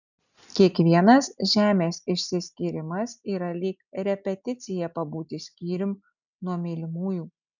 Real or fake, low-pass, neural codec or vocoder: real; 7.2 kHz; none